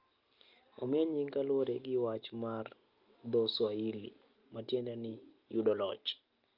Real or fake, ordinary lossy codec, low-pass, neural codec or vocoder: real; Opus, 24 kbps; 5.4 kHz; none